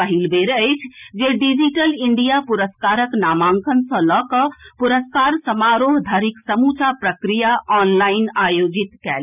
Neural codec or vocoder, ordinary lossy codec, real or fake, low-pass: none; none; real; 3.6 kHz